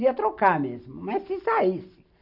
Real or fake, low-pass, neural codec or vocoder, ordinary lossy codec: real; 5.4 kHz; none; none